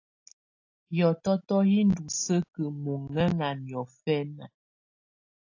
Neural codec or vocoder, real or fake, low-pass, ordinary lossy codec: none; real; 7.2 kHz; AAC, 48 kbps